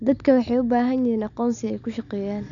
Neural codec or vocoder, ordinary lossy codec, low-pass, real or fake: none; none; 7.2 kHz; real